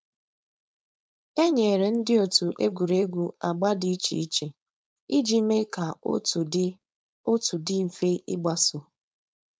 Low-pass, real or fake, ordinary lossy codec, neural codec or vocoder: none; fake; none; codec, 16 kHz, 4.8 kbps, FACodec